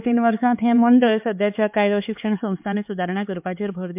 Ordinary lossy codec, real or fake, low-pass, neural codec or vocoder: MP3, 32 kbps; fake; 3.6 kHz; codec, 16 kHz, 4 kbps, X-Codec, HuBERT features, trained on LibriSpeech